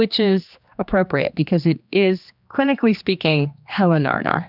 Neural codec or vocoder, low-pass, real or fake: codec, 16 kHz, 2 kbps, X-Codec, HuBERT features, trained on general audio; 5.4 kHz; fake